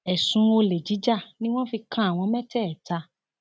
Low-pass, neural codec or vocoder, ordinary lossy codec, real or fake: none; none; none; real